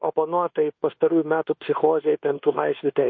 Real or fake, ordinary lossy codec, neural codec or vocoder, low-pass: fake; MP3, 32 kbps; codec, 24 kHz, 1.2 kbps, DualCodec; 7.2 kHz